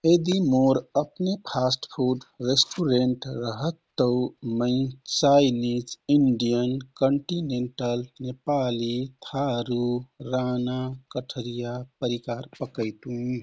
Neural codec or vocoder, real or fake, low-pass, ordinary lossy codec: none; real; none; none